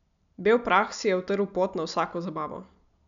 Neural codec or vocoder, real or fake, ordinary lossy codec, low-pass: none; real; none; 7.2 kHz